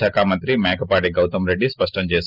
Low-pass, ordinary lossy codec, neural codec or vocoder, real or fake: 5.4 kHz; Opus, 32 kbps; none; real